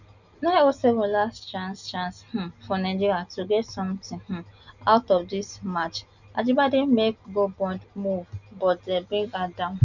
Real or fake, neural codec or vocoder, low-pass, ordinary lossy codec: real; none; 7.2 kHz; none